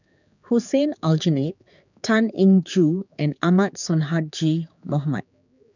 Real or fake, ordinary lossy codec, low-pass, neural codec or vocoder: fake; none; 7.2 kHz; codec, 16 kHz, 4 kbps, X-Codec, HuBERT features, trained on general audio